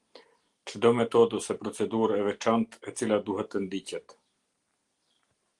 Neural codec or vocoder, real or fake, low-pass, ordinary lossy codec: none; real; 10.8 kHz; Opus, 24 kbps